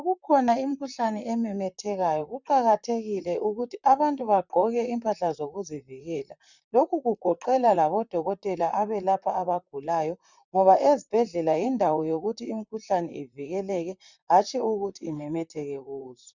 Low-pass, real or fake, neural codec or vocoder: 7.2 kHz; fake; vocoder, 44.1 kHz, 128 mel bands, Pupu-Vocoder